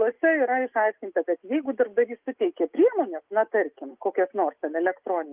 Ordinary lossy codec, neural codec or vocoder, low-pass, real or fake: Opus, 24 kbps; none; 3.6 kHz; real